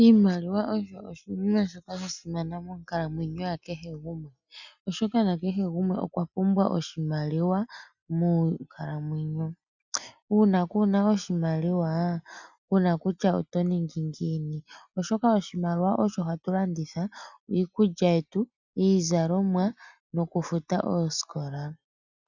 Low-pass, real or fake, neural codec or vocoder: 7.2 kHz; real; none